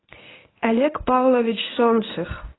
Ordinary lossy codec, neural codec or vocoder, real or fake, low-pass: AAC, 16 kbps; codec, 24 kHz, 0.9 kbps, WavTokenizer, medium speech release version 1; fake; 7.2 kHz